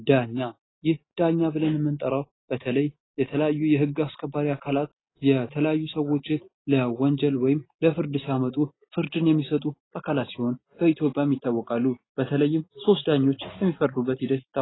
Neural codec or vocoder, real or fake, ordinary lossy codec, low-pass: none; real; AAC, 16 kbps; 7.2 kHz